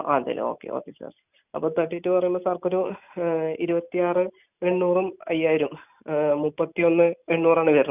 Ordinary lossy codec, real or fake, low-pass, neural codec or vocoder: none; real; 3.6 kHz; none